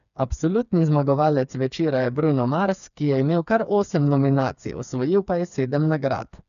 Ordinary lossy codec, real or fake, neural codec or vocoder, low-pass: none; fake; codec, 16 kHz, 4 kbps, FreqCodec, smaller model; 7.2 kHz